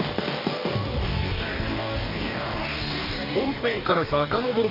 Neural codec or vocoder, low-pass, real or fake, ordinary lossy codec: codec, 44.1 kHz, 2.6 kbps, DAC; 5.4 kHz; fake; none